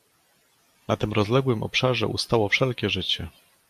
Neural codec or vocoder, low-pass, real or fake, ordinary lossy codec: none; 14.4 kHz; real; AAC, 96 kbps